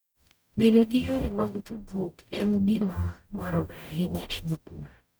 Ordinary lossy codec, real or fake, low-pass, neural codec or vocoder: none; fake; none; codec, 44.1 kHz, 0.9 kbps, DAC